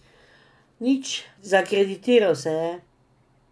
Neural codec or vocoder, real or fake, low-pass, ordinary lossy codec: none; real; none; none